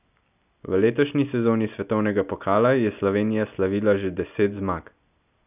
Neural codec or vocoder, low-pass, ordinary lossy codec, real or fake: none; 3.6 kHz; none; real